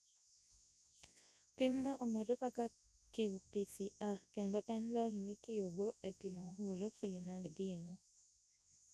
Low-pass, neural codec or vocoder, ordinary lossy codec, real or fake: none; codec, 24 kHz, 0.9 kbps, WavTokenizer, large speech release; none; fake